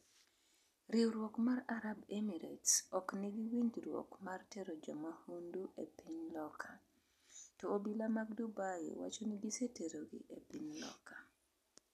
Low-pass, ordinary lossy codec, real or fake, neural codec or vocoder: 14.4 kHz; none; real; none